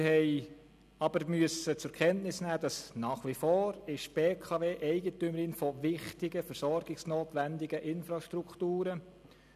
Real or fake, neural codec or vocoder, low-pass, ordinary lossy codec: real; none; 14.4 kHz; none